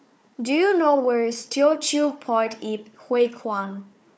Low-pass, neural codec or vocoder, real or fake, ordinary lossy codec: none; codec, 16 kHz, 4 kbps, FunCodec, trained on Chinese and English, 50 frames a second; fake; none